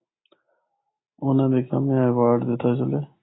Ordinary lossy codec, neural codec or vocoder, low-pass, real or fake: AAC, 16 kbps; none; 7.2 kHz; real